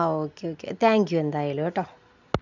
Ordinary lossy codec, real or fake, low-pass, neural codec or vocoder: none; real; 7.2 kHz; none